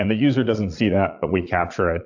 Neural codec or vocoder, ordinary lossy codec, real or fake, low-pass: vocoder, 22.05 kHz, 80 mel bands, Vocos; AAC, 48 kbps; fake; 7.2 kHz